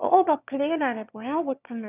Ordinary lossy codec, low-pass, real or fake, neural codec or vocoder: none; 3.6 kHz; fake; autoencoder, 22.05 kHz, a latent of 192 numbers a frame, VITS, trained on one speaker